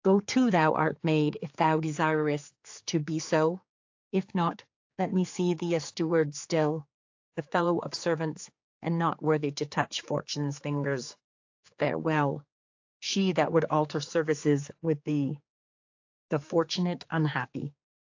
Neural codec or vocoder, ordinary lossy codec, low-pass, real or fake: codec, 16 kHz, 4 kbps, X-Codec, HuBERT features, trained on general audio; AAC, 48 kbps; 7.2 kHz; fake